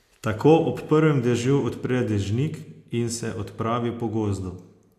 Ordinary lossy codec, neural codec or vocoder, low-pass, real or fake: AAC, 64 kbps; none; 14.4 kHz; real